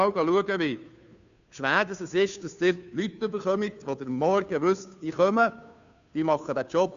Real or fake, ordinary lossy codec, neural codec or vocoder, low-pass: fake; none; codec, 16 kHz, 2 kbps, FunCodec, trained on Chinese and English, 25 frames a second; 7.2 kHz